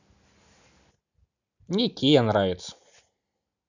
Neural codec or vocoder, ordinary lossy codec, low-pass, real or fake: none; none; 7.2 kHz; real